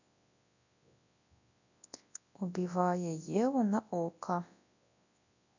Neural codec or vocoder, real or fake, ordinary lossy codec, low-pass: codec, 24 kHz, 0.9 kbps, WavTokenizer, large speech release; fake; none; 7.2 kHz